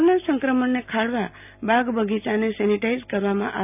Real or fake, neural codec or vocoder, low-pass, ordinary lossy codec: real; none; 3.6 kHz; none